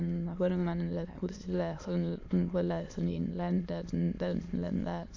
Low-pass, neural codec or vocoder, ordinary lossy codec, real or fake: 7.2 kHz; autoencoder, 22.05 kHz, a latent of 192 numbers a frame, VITS, trained on many speakers; AAC, 48 kbps; fake